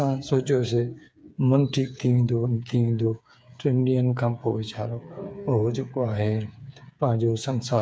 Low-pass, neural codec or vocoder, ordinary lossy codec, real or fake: none; codec, 16 kHz, 8 kbps, FreqCodec, smaller model; none; fake